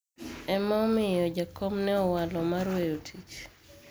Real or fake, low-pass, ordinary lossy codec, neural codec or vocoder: real; none; none; none